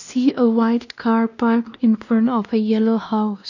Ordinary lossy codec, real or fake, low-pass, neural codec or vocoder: none; fake; 7.2 kHz; codec, 16 kHz, 1 kbps, X-Codec, WavLM features, trained on Multilingual LibriSpeech